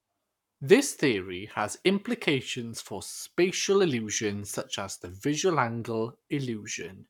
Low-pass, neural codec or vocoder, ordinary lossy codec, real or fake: 19.8 kHz; codec, 44.1 kHz, 7.8 kbps, Pupu-Codec; none; fake